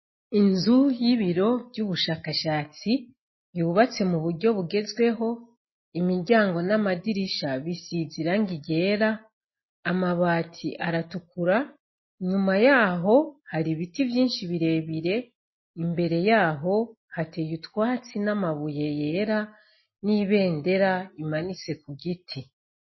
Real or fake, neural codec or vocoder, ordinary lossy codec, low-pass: real; none; MP3, 24 kbps; 7.2 kHz